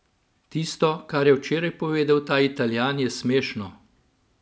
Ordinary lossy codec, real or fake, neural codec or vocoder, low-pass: none; real; none; none